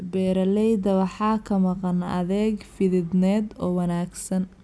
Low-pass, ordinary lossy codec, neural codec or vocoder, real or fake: none; none; none; real